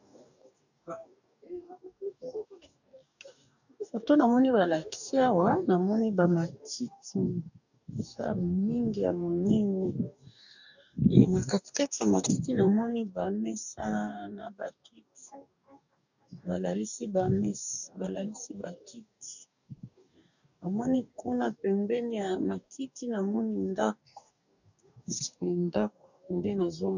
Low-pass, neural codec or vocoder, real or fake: 7.2 kHz; codec, 44.1 kHz, 2.6 kbps, DAC; fake